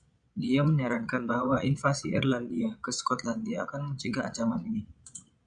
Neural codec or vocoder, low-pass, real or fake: vocoder, 22.05 kHz, 80 mel bands, Vocos; 9.9 kHz; fake